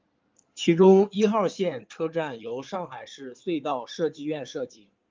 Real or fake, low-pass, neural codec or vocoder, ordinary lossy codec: fake; 7.2 kHz; codec, 16 kHz in and 24 kHz out, 2.2 kbps, FireRedTTS-2 codec; Opus, 32 kbps